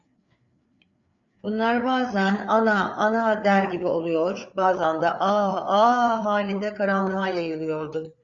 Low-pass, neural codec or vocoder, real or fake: 7.2 kHz; codec, 16 kHz, 4 kbps, FreqCodec, larger model; fake